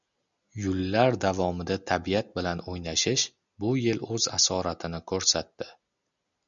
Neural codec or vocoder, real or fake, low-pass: none; real; 7.2 kHz